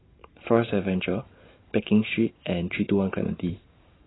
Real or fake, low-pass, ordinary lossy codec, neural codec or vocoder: fake; 7.2 kHz; AAC, 16 kbps; vocoder, 44.1 kHz, 128 mel bands every 512 samples, BigVGAN v2